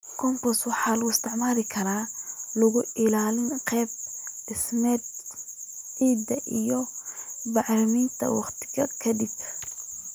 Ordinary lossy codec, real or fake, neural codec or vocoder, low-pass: none; real; none; none